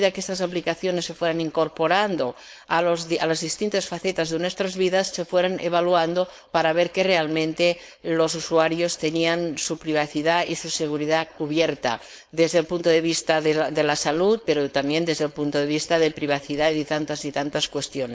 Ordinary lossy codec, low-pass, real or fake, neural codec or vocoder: none; none; fake; codec, 16 kHz, 4.8 kbps, FACodec